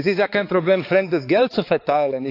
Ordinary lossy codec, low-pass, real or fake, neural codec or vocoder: AAC, 32 kbps; 5.4 kHz; fake; codec, 16 kHz, 4 kbps, X-Codec, HuBERT features, trained on balanced general audio